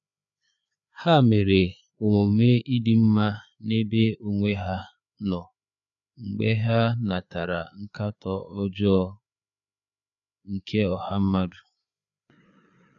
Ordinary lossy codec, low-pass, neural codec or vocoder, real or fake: none; 7.2 kHz; codec, 16 kHz, 4 kbps, FreqCodec, larger model; fake